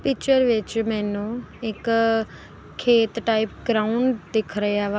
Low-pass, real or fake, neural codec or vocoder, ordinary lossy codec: none; real; none; none